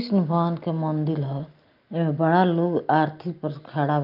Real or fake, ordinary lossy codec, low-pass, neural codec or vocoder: real; Opus, 32 kbps; 5.4 kHz; none